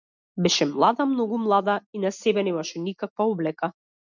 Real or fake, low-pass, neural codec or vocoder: real; 7.2 kHz; none